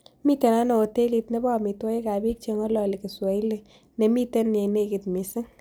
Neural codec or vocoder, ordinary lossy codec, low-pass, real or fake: none; none; none; real